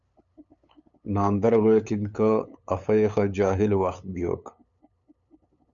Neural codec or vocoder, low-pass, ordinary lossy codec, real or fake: codec, 16 kHz, 8 kbps, FunCodec, trained on LibriTTS, 25 frames a second; 7.2 kHz; MP3, 96 kbps; fake